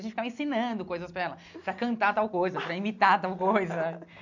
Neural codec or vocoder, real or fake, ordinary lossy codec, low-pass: none; real; none; 7.2 kHz